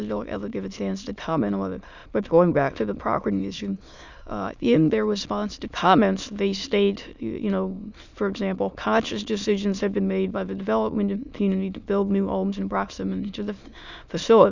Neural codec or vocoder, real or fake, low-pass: autoencoder, 22.05 kHz, a latent of 192 numbers a frame, VITS, trained on many speakers; fake; 7.2 kHz